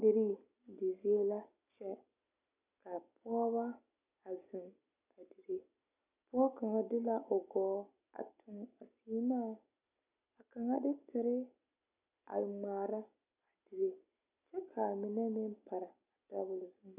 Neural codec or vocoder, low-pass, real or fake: none; 3.6 kHz; real